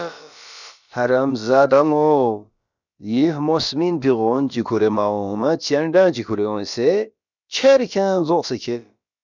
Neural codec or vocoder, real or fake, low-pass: codec, 16 kHz, about 1 kbps, DyCAST, with the encoder's durations; fake; 7.2 kHz